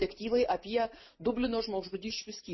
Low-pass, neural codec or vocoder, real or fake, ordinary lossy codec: 7.2 kHz; none; real; MP3, 24 kbps